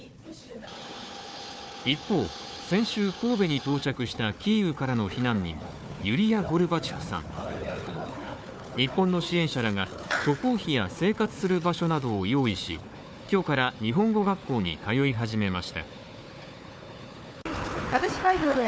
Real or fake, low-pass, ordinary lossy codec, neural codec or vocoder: fake; none; none; codec, 16 kHz, 4 kbps, FunCodec, trained on Chinese and English, 50 frames a second